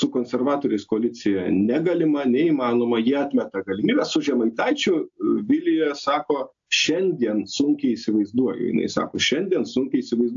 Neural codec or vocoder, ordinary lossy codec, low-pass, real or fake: none; AAC, 64 kbps; 7.2 kHz; real